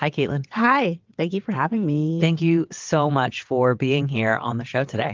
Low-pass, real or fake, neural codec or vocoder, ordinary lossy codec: 7.2 kHz; fake; vocoder, 22.05 kHz, 80 mel bands, WaveNeXt; Opus, 32 kbps